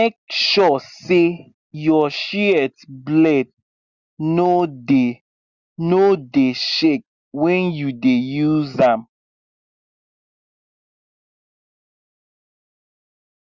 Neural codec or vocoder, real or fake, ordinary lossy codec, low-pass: none; real; Opus, 64 kbps; 7.2 kHz